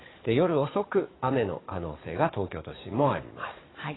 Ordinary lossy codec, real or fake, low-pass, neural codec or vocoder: AAC, 16 kbps; fake; 7.2 kHz; codec, 44.1 kHz, 7.8 kbps, DAC